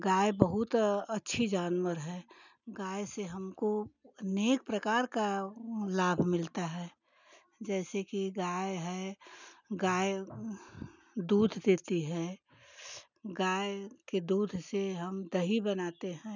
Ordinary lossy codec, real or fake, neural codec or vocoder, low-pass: none; real; none; 7.2 kHz